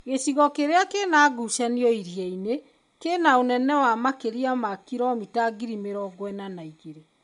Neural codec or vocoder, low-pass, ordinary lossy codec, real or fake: none; 10.8 kHz; MP3, 64 kbps; real